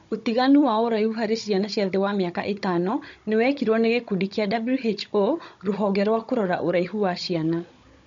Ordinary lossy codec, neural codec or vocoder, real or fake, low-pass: MP3, 48 kbps; codec, 16 kHz, 16 kbps, FunCodec, trained on Chinese and English, 50 frames a second; fake; 7.2 kHz